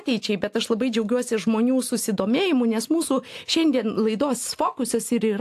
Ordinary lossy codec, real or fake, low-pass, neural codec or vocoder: AAC, 64 kbps; real; 14.4 kHz; none